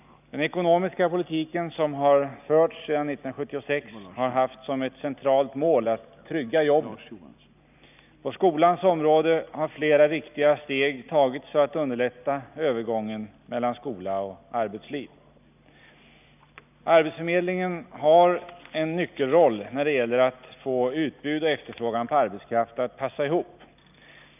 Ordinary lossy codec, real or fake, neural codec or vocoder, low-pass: none; real; none; 3.6 kHz